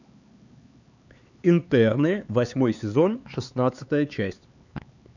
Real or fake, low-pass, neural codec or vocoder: fake; 7.2 kHz; codec, 16 kHz, 4 kbps, X-Codec, HuBERT features, trained on LibriSpeech